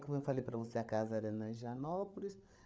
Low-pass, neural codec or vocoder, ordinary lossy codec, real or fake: none; codec, 16 kHz, 8 kbps, FreqCodec, larger model; none; fake